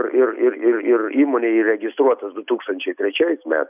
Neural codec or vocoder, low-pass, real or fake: none; 3.6 kHz; real